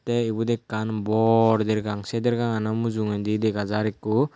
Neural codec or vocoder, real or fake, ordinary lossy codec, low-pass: none; real; none; none